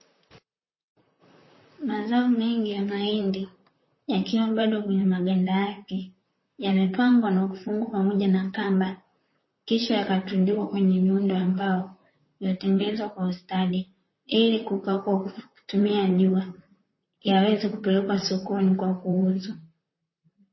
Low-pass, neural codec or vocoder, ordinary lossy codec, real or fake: 7.2 kHz; vocoder, 44.1 kHz, 128 mel bands, Pupu-Vocoder; MP3, 24 kbps; fake